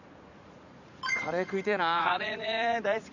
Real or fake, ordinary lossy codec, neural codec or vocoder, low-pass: fake; none; vocoder, 44.1 kHz, 80 mel bands, Vocos; 7.2 kHz